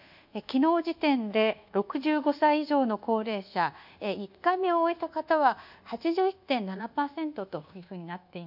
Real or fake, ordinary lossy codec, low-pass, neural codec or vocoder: fake; none; 5.4 kHz; codec, 24 kHz, 1.2 kbps, DualCodec